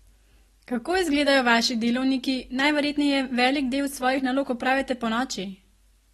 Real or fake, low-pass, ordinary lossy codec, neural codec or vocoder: real; 19.8 kHz; AAC, 32 kbps; none